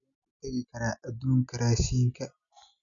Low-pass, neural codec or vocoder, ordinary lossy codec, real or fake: 7.2 kHz; none; none; real